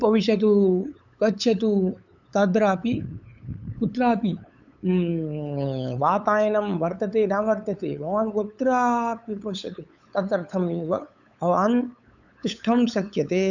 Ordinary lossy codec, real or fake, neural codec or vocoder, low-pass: none; fake; codec, 16 kHz, 8 kbps, FunCodec, trained on LibriTTS, 25 frames a second; 7.2 kHz